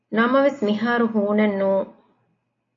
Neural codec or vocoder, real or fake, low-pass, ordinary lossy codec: none; real; 7.2 kHz; AAC, 32 kbps